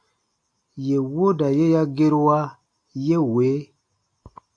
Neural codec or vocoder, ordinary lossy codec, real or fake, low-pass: none; AAC, 48 kbps; real; 9.9 kHz